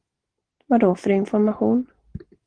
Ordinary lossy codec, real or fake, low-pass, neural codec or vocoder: Opus, 16 kbps; real; 9.9 kHz; none